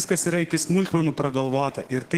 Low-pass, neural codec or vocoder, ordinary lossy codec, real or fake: 14.4 kHz; codec, 32 kHz, 1.9 kbps, SNAC; Opus, 16 kbps; fake